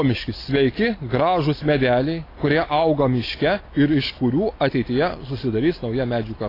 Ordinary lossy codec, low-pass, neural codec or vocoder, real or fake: AAC, 24 kbps; 5.4 kHz; none; real